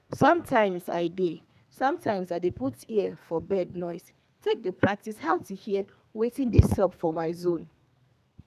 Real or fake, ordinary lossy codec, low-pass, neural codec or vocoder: fake; none; 14.4 kHz; codec, 32 kHz, 1.9 kbps, SNAC